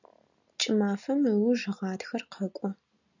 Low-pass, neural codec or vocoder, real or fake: 7.2 kHz; none; real